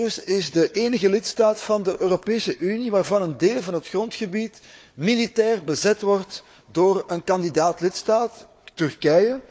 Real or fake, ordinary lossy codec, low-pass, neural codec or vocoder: fake; none; none; codec, 16 kHz, 4 kbps, FunCodec, trained on Chinese and English, 50 frames a second